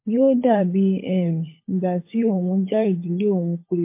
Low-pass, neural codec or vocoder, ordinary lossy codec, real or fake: 3.6 kHz; codec, 16 kHz, 16 kbps, FunCodec, trained on LibriTTS, 50 frames a second; MP3, 24 kbps; fake